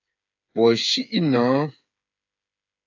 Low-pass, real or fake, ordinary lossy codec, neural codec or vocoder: 7.2 kHz; fake; AAC, 48 kbps; codec, 16 kHz, 8 kbps, FreqCodec, smaller model